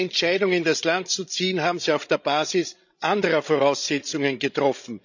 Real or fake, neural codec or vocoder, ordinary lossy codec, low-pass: fake; codec, 16 kHz, 16 kbps, FreqCodec, larger model; none; 7.2 kHz